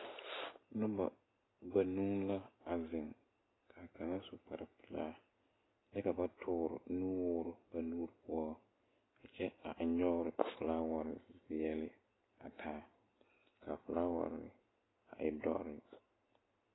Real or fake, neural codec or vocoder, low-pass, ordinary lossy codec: real; none; 7.2 kHz; AAC, 16 kbps